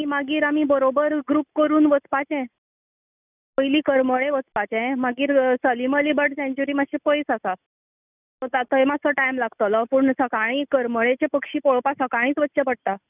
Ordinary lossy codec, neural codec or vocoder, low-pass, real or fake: none; none; 3.6 kHz; real